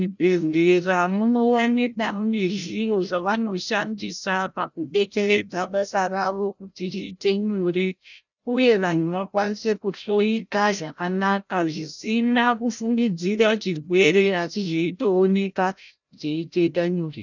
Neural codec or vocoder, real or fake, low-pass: codec, 16 kHz, 0.5 kbps, FreqCodec, larger model; fake; 7.2 kHz